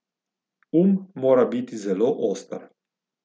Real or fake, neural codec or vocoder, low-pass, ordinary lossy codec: real; none; none; none